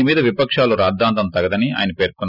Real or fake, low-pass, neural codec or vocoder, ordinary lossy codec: real; 5.4 kHz; none; none